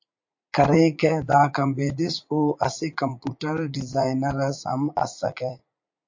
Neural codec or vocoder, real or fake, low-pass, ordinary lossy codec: vocoder, 44.1 kHz, 80 mel bands, Vocos; fake; 7.2 kHz; MP3, 48 kbps